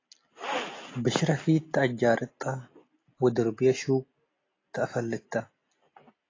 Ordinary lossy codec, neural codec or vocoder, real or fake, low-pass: AAC, 32 kbps; none; real; 7.2 kHz